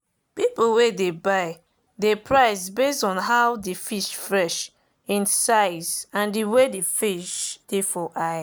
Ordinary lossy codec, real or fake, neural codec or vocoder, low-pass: none; real; none; none